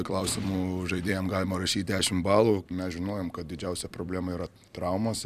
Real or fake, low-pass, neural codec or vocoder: fake; 14.4 kHz; vocoder, 44.1 kHz, 128 mel bands every 256 samples, BigVGAN v2